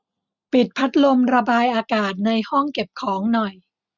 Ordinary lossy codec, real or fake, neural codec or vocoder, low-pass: none; real; none; 7.2 kHz